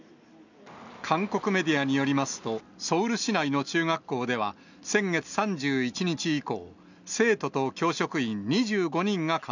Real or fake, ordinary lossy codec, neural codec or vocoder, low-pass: real; none; none; 7.2 kHz